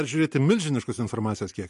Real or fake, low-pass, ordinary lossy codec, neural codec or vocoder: real; 14.4 kHz; MP3, 48 kbps; none